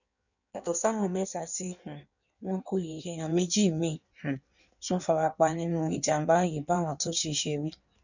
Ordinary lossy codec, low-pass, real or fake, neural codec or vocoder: none; 7.2 kHz; fake; codec, 16 kHz in and 24 kHz out, 1.1 kbps, FireRedTTS-2 codec